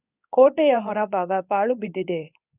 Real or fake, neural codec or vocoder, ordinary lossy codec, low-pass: fake; codec, 24 kHz, 0.9 kbps, WavTokenizer, medium speech release version 2; none; 3.6 kHz